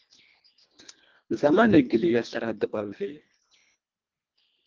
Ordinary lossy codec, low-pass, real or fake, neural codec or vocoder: Opus, 32 kbps; 7.2 kHz; fake; codec, 24 kHz, 1.5 kbps, HILCodec